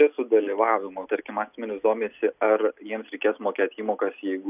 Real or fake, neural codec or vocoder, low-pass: real; none; 3.6 kHz